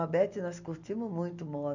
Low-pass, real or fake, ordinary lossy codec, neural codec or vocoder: 7.2 kHz; real; none; none